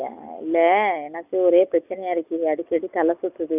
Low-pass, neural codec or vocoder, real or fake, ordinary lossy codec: 3.6 kHz; none; real; none